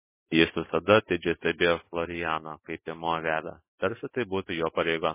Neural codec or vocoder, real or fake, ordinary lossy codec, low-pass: autoencoder, 48 kHz, 128 numbers a frame, DAC-VAE, trained on Japanese speech; fake; MP3, 16 kbps; 3.6 kHz